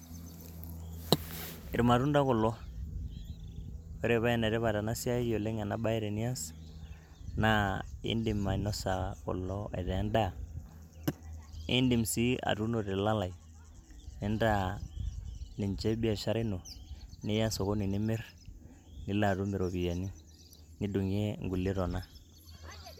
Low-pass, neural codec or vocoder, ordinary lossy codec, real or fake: 19.8 kHz; none; MP3, 96 kbps; real